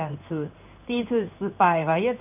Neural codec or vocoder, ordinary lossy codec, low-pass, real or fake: codec, 16 kHz in and 24 kHz out, 1 kbps, XY-Tokenizer; none; 3.6 kHz; fake